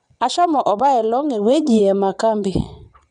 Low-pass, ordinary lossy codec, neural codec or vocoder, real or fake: 9.9 kHz; none; vocoder, 22.05 kHz, 80 mel bands, WaveNeXt; fake